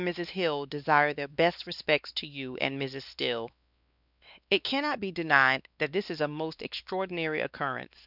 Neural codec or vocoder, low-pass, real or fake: codec, 16 kHz, 2 kbps, X-Codec, WavLM features, trained on Multilingual LibriSpeech; 5.4 kHz; fake